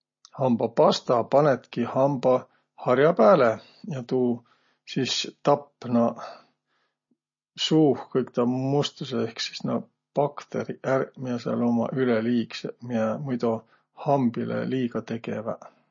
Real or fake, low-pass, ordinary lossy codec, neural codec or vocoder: real; 7.2 kHz; MP3, 32 kbps; none